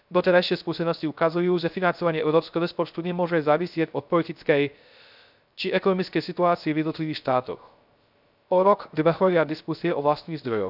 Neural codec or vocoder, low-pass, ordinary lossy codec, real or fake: codec, 16 kHz, 0.3 kbps, FocalCodec; 5.4 kHz; none; fake